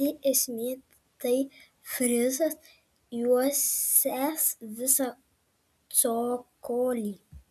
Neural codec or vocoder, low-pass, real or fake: none; 14.4 kHz; real